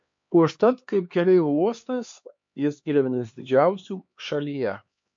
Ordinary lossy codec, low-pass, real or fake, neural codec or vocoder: MP3, 48 kbps; 7.2 kHz; fake; codec, 16 kHz, 2 kbps, X-Codec, HuBERT features, trained on LibriSpeech